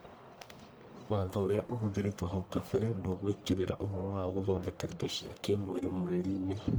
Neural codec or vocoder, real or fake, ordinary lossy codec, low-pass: codec, 44.1 kHz, 1.7 kbps, Pupu-Codec; fake; none; none